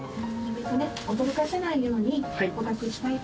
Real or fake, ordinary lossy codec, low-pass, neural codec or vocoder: real; none; none; none